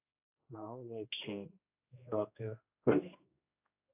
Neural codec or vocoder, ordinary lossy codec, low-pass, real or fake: codec, 16 kHz, 1 kbps, X-Codec, HuBERT features, trained on general audio; AAC, 32 kbps; 3.6 kHz; fake